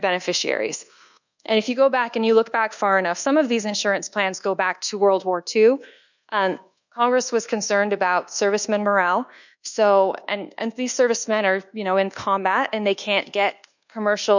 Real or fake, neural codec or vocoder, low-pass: fake; codec, 24 kHz, 1.2 kbps, DualCodec; 7.2 kHz